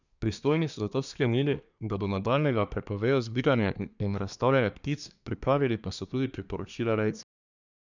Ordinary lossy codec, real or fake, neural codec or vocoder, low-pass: none; fake; codec, 24 kHz, 1 kbps, SNAC; 7.2 kHz